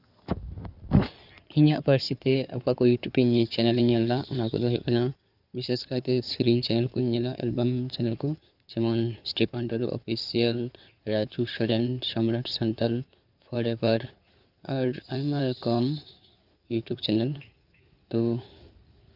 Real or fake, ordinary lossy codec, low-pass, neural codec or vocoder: fake; none; 5.4 kHz; codec, 16 kHz in and 24 kHz out, 2.2 kbps, FireRedTTS-2 codec